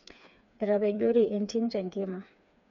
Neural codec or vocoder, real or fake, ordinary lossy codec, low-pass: codec, 16 kHz, 4 kbps, FreqCodec, smaller model; fake; none; 7.2 kHz